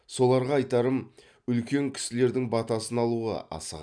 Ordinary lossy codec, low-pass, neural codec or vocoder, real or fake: none; 9.9 kHz; none; real